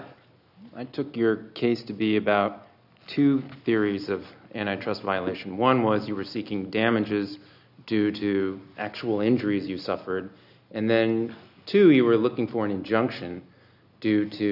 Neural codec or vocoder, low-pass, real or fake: none; 5.4 kHz; real